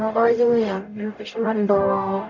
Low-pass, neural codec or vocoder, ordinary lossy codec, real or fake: 7.2 kHz; codec, 44.1 kHz, 0.9 kbps, DAC; none; fake